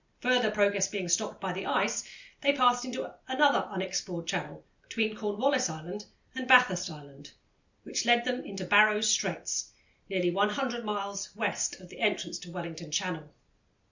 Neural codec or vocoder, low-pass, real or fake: none; 7.2 kHz; real